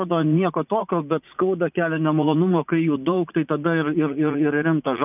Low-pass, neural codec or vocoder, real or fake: 3.6 kHz; vocoder, 44.1 kHz, 80 mel bands, Vocos; fake